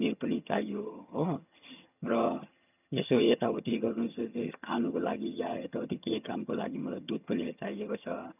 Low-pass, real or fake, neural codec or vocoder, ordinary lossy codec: 3.6 kHz; fake; vocoder, 22.05 kHz, 80 mel bands, HiFi-GAN; none